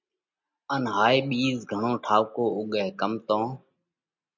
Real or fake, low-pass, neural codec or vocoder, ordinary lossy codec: real; 7.2 kHz; none; MP3, 64 kbps